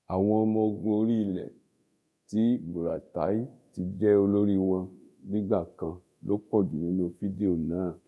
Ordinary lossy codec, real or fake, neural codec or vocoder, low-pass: none; fake; codec, 24 kHz, 0.9 kbps, DualCodec; none